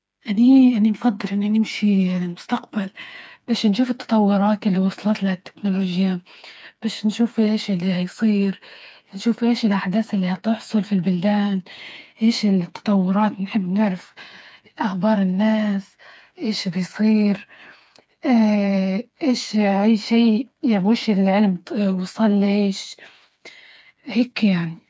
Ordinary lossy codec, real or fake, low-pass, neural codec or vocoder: none; fake; none; codec, 16 kHz, 4 kbps, FreqCodec, smaller model